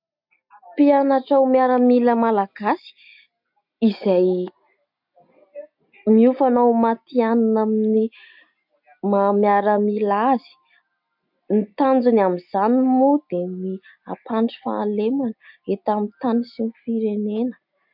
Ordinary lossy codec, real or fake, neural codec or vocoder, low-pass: MP3, 48 kbps; real; none; 5.4 kHz